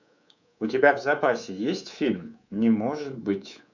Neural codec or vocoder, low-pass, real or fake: codec, 24 kHz, 3.1 kbps, DualCodec; 7.2 kHz; fake